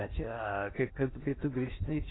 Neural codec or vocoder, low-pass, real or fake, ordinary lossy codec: codec, 16 kHz in and 24 kHz out, 0.8 kbps, FocalCodec, streaming, 65536 codes; 7.2 kHz; fake; AAC, 16 kbps